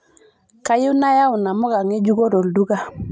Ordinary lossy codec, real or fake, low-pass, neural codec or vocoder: none; real; none; none